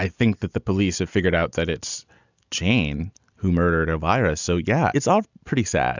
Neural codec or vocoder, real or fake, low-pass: none; real; 7.2 kHz